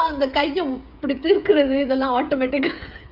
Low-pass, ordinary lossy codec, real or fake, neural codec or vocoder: 5.4 kHz; none; fake; codec, 16 kHz, 8 kbps, FreqCodec, smaller model